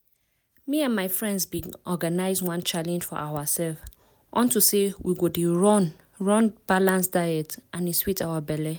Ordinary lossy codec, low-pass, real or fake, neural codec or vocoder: none; none; real; none